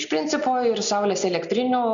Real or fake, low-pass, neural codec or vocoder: real; 7.2 kHz; none